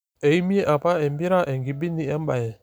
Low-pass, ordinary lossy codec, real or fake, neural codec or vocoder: none; none; real; none